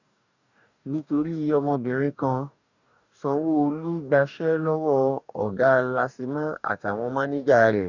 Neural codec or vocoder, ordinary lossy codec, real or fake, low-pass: codec, 44.1 kHz, 2.6 kbps, DAC; none; fake; 7.2 kHz